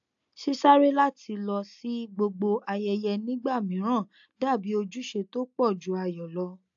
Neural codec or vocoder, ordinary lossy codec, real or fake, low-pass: none; AAC, 64 kbps; real; 7.2 kHz